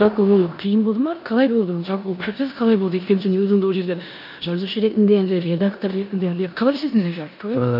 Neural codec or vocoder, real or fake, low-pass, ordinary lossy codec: codec, 16 kHz in and 24 kHz out, 0.9 kbps, LongCat-Audio-Codec, four codebook decoder; fake; 5.4 kHz; none